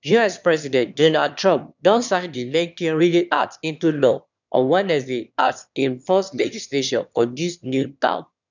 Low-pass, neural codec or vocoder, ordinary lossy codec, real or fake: 7.2 kHz; autoencoder, 22.05 kHz, a latent of 192 numbers a frame, VITS, trained on one speaker; none; fake